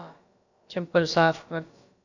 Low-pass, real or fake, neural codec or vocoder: 7.2 kHz; fake; codec, 16 kHz, about 1 kbps, DyCAST, with the encoder's durations